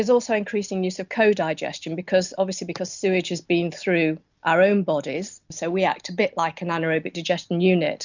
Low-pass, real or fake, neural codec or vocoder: 7.2 kHz; real; none